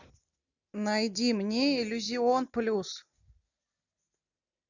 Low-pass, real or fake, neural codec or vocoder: 7.2 kHz; real; none